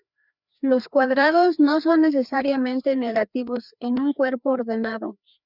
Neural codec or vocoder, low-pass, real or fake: codec, 16 kHz, 2 kbps, FreqCodec, larger model; 5.4 kHz; fake